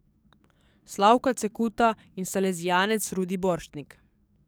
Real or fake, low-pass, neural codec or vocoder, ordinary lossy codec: fake; none; codec, 44.1 kHz, 7.8 kbps, DAC; none